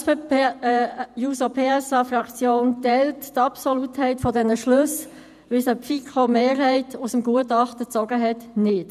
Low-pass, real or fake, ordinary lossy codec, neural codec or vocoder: 14.4 kHz; fake; none; vocoder, 48 kHz, 128 mel bands, Vocos